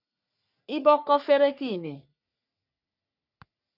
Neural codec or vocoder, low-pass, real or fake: codec, 44.1 kHz, 3.4 kbps, Pupu-Codec; 5.4 kHz; fake